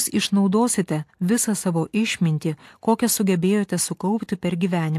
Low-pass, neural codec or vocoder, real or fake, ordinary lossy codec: 14.4 kHz; none; real; AAC, 64 kbps